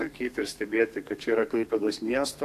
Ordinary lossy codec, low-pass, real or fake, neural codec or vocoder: AAC, 64 kbps; 14.4 kHz; fake; codec, 32 kHz, 1.9 kbps, SNAC